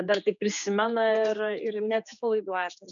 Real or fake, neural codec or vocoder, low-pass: fake; codec, 16 kHz, 4 kbps, X-Codec, HuBERT features, trained on general audio; 7.2 kHz